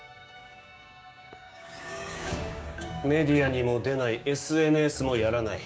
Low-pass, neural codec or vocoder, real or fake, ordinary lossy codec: none; codec, 16 kHz, 6 kbps, DAC; fake; none